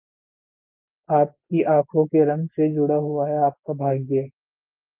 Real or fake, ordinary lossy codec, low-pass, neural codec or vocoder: real; Opus, 32 kbps; 3.6 kHz; none